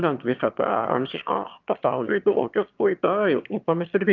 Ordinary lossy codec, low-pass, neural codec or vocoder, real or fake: Opus, 24 kbps; 7.2 kHz; autoencoder, 22.05 kHz, a latent of 192 numbers a frame, VITS, trained on one speaker; fake